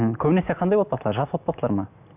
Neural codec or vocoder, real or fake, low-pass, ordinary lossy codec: none; real; 3.6 kHz; none